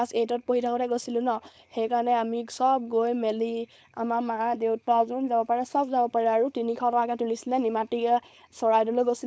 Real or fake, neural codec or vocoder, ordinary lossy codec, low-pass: fake; codec, 16 kHz, 4.8 kbps, FACodec; none; none